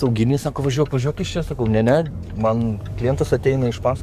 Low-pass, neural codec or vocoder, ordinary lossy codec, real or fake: 14.4 kHz; codec, 44.1 kHz, 7.8 kbps, DAC; Opus, 64 kbps; fake